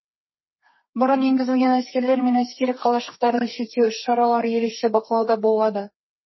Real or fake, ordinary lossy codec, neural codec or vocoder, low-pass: fake; MP3, 24 kbps; codec, 32 kHz, 1.9 kbps, SNAC; 7.2 kHz